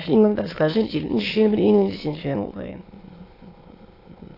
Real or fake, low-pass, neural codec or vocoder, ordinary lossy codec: fake; 5.4 kHz; autoencoder, 22.05 kHz, a latent of 192 numbers a frame, VITS, trained on many speakers; AAC, 24 kbps